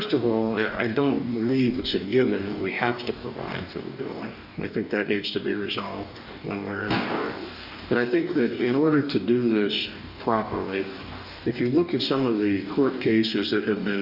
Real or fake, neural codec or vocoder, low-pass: fake; codec, 44.1 kHz, 2.6 kbps, DAC; 5.4 kHz